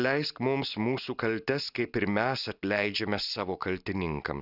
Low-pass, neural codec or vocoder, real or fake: 5.4 kHz; none; real